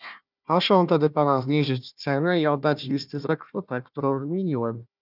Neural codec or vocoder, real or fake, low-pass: codec, 16 kHz, 1 kbps, FunCodec, trained on Chinese and English, 50 frames a second; fake; 5.4 kHz